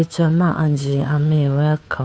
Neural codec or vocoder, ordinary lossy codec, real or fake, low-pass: codec, 16 kHz, 2 kbps, FunCodec, trained on Chinese and English, 25 frames a second; none; fake; none